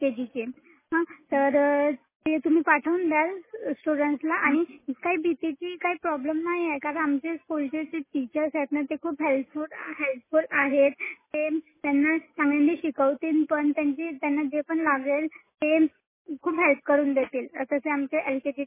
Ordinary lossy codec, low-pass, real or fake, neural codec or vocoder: MP3, 16 kbps; 3.6 kHz; real; none